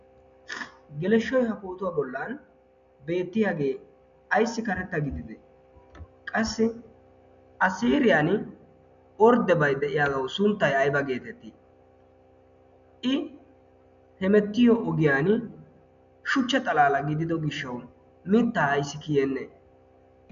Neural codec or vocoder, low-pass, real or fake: none; 7.2 kHz; real